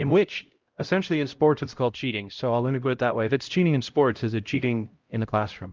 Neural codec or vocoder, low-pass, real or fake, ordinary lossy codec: codec, 16 kHz, 0.5 kbps, X-Codec, HuBERT features, trained on LibriSpeech; 7.2 kHz; fake; Opus, 32 kbps